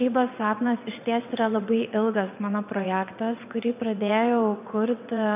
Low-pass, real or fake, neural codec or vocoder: 3.6 kHz; fake; vocoder, 22.05 kHz, 80 mel bands, WaveNeXt